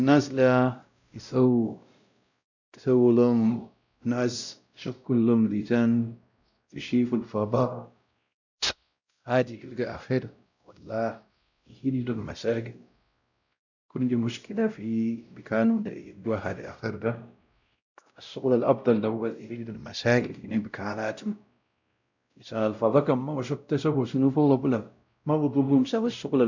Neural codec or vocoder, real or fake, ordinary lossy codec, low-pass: codec, 16 kHz, 0.5 kbps, X-Codec, WavLM features, trained on Multilingual LibriSpeech; fake; none; 7.2 kHz